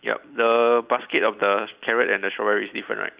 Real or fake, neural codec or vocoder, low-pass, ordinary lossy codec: real; none; 3.6 kHz; Opus, 64 kbps